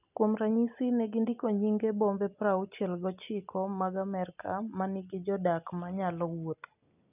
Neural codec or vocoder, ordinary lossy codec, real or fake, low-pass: none; none; real; 3.6 kHz